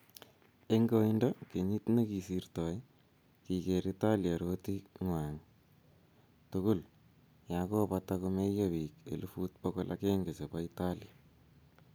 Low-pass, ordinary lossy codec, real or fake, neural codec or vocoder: none; none; real; none